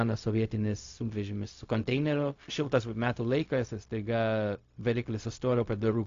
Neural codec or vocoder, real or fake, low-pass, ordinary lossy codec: codec, 16 kHz, 0.4 kbps, LongCat-Audio-Codec; fake; 7.2 kHz; AAC, 48 kbps